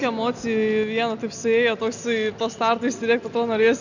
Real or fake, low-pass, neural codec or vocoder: real; 7.2 kHz; none